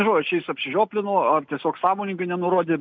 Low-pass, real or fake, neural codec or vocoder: 7.2 kHz; real; none